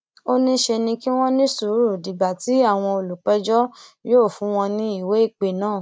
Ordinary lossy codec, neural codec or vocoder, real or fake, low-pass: none; none; real; none